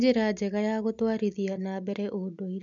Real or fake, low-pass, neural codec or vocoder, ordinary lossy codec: real; 7.2 kHz; none; MP3, 96 kbps